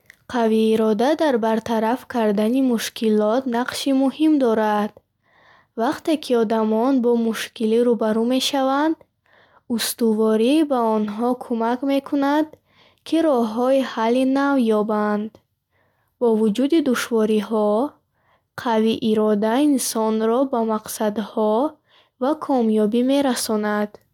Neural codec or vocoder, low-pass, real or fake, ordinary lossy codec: none; 19.8 kHz; real; none